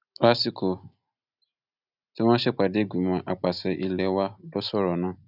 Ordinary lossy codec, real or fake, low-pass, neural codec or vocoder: none; real; 5.4 kHz; none